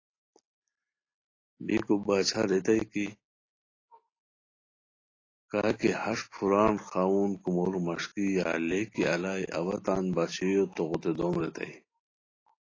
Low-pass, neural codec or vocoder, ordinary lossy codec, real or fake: 7.2 kHz; none; AAC, 32 kbps; real